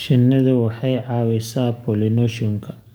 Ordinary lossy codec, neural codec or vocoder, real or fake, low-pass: none; codec, 44.1 kHz, 7.8 kbps, DAC; fake; none